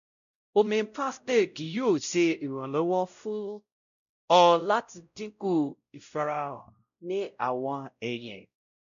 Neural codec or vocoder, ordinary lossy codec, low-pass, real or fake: codec, 16 kHz, 0.5 kbps, X-Codec, WavLM features, trained on Multilingual LibriSpeech; none; 7.2 kHz; fake